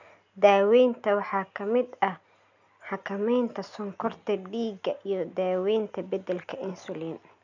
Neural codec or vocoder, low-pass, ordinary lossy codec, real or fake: none; 7.2 kHz; none; real